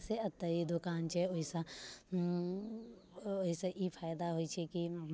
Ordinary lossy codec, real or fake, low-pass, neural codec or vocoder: none; real; none; none